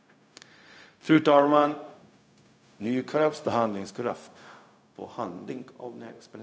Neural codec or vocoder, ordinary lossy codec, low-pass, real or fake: codec, 16 kHz, 0.4 kbps, LongCat-Audio-Codec; none; none; fake